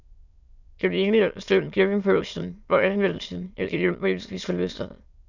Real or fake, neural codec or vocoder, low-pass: fake; autoencoder, 22.05 kHz, a latent of 192 numbers a frame, VITS, trained on many speakers; 7.2 kHz